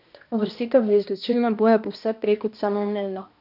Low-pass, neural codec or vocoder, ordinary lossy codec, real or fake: 5.4 kHz; codec, 16 kHz, 1 kbps, X-Codec, HuBERT features, trained on balanced general audio; none; fake